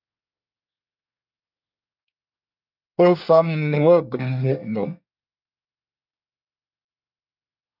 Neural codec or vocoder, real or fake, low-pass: codec, 24 kHz, 1 kbps, SNAC; fake; 5.4 kHz